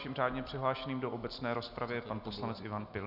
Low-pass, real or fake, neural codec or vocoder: 5.4 kHz; real; none